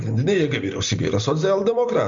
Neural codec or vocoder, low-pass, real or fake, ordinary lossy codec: none; 7.2 kHz; real; MP3, 64 kbps